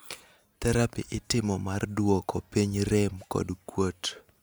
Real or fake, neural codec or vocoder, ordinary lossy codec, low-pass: real; none; none; none